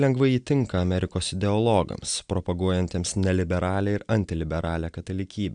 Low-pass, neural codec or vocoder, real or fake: 9.9 kHz; none; real